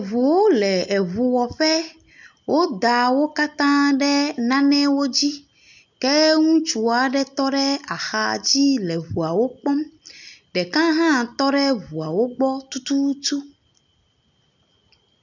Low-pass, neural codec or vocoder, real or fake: 7.2 kHz; none; real